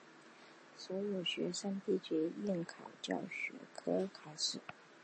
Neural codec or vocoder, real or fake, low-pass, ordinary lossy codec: none; real; 10.8 kHz; MP3, 32 kbps